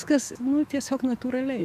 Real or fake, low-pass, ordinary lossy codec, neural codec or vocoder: fake; 14.4 kHz; Opus, 64 kbps; autoencoder, 48 kHz, 128 numbers a frame, DAC-VAE, trained on Japanese speech